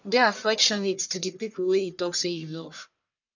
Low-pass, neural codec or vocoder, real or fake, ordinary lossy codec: 7.2 kHz; codec, 44.1 kHz, 1.7 kbps, Pupu-Codec; fake; none